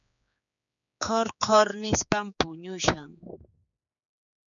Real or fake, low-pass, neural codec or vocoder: fake; 7.2 kHz; codec, 16 kHz, 4 kbps, X-Codec, HuBERT features, trained on general audio